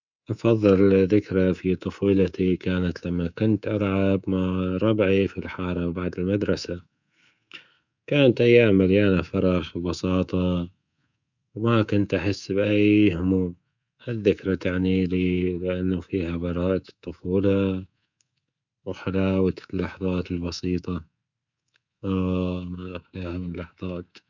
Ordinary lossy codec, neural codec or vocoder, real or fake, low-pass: none; codec, 24 kHz, 3.1 kbps, DualCodec; fake; 7.2 kHz